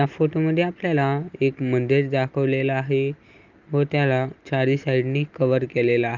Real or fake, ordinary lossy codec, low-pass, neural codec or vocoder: real; Opus, 24 kbps; 7.2 kHz; none